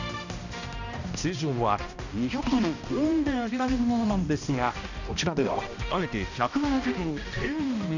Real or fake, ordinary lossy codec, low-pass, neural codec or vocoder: fake; none; 7.2 kHz; codec, 16 kHz, 1 kbps, X-Codec, HuBERT features, trained on balanced general audio